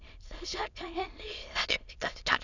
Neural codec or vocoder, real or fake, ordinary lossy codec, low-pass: autoencoder, 22.05 kHz, a latent of 192 numbers a frame, VITS, trained on many speakers; fake; none; 7.2 kHz